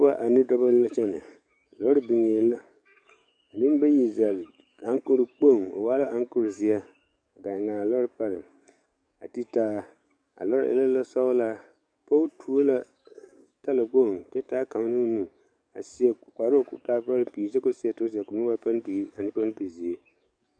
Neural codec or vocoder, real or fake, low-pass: codec, 44.1 kHz, 7.8 kbps, DAC; fake; 9.9 kHz